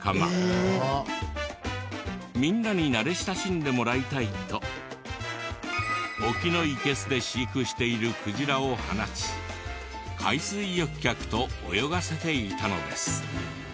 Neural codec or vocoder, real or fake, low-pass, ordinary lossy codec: none; real; none; none